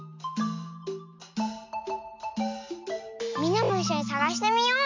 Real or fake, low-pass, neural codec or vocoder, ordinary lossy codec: real; 7.2 kHz; none; MP3, 64 kbps